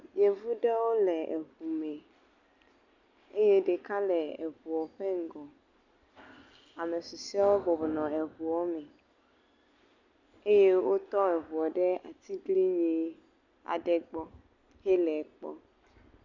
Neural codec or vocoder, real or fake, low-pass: none; real; 7.2 kHz